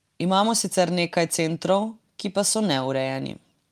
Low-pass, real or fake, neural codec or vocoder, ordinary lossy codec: 14.4 kHz; real; none; Opus, 32 kbps